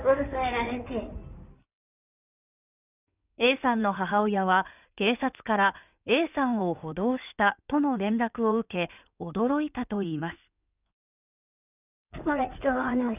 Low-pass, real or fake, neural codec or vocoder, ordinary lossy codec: 3.6 kHz; fake; codec, 16 kHz in and 24 kHz out, 2.2 kbps, FireRedTTS-2 codec; none